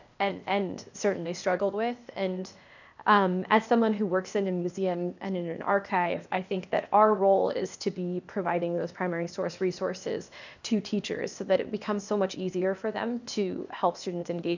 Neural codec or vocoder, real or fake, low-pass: codec, 16 kHz, 0.8 kbps, ZipCodec; fake; 7.2 kHz